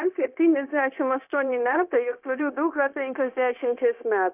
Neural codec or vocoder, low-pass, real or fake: codec, 16 kHz, 0.9 kbps, LongCat-Audio-Codec; 3.6 kHz; fake